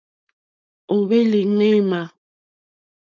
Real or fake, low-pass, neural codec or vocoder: fake; 7.2 kHz; codec, 16 kHz, 4.8 kbps, FACodec